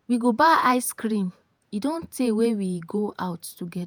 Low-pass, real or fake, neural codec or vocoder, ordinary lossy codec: none; fake; vocoder, 48 kHz, 128 mel bands, Vocos; none